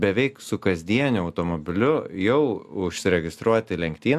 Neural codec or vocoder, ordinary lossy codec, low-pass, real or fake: none; AAC, 96 kbps; 14.4 kHz; real